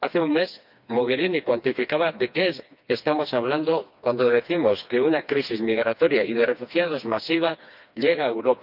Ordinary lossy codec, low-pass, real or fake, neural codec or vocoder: none; 5.4 kHz; fake; codec, 16 kHz, 2 kbps, FreqCodec, smaller model